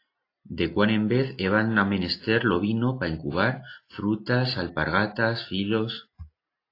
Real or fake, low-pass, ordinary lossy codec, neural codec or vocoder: real; 5.4 kHz; AAC, 32 kbps; none